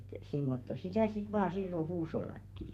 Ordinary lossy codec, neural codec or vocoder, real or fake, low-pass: none; codec, 32 kHz, 1.9 kbps, SNAC; fake; 14.4 kHz